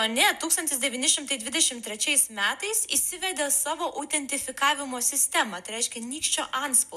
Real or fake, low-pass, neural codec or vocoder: real; 14.4 kHz; none